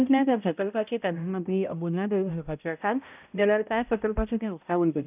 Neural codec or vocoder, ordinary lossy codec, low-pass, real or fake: codec, 16 kHz, 0.5 kbps, X-Codec, HuBERT features, trained on balanced general audio; none; 3.6 kHz; fake